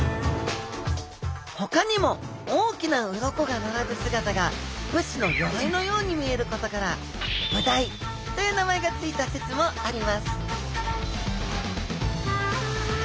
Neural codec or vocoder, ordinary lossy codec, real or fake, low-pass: none; none; real; none